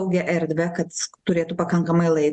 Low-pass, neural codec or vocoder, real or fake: 10.8 kHz; none; real